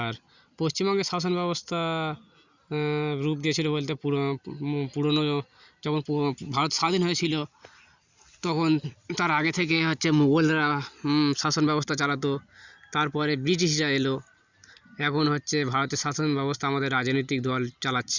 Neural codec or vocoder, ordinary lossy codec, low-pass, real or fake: none; Opus, 64 kbps; 7.2 kHz; real